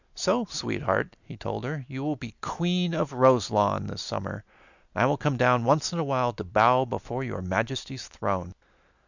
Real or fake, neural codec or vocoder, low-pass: real; none; 7.2 kHz